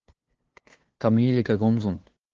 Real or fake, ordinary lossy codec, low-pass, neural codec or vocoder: fake; Opus, 16 kbps; 7.2 kHz; codec, 16 kHz, 2 kbps, FunCodec, trained on LibriTTS, 25 frames a second